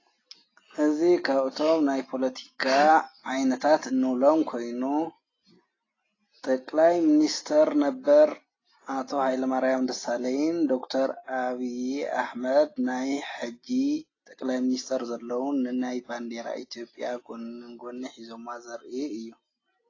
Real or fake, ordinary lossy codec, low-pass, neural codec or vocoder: real; AAC, 32 kbps; 7.2 kHz; none